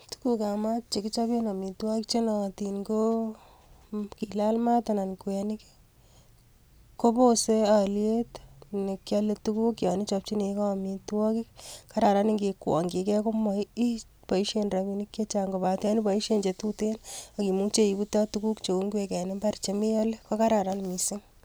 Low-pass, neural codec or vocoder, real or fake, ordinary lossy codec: none; none; real; none